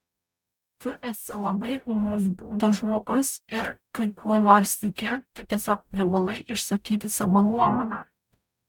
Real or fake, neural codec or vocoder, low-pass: fake; codec, 44.1 kHz, 0.9 kbps, DAC; 19.8 kHz